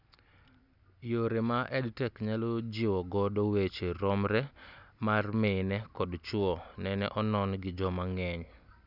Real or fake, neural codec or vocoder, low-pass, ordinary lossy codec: real; none; 5.4 kHz; none